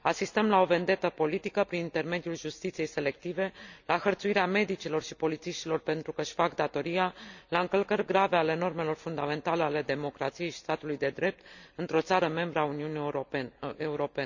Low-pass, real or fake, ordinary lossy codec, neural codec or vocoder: 7.2 kHz; real; none; none